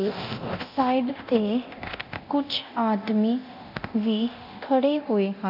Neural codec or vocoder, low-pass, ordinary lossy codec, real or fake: codec, 24 kHz, 0.9 kbps, DualCodec; 5.4 kHz; none; fake